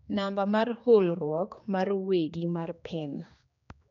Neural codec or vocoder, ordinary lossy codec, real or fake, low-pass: codec, 16 kHz, 2 kbps, X-Codec, HuBERT features, trained on general audio; MP3, 64 kbps; fake; 7.2 kHz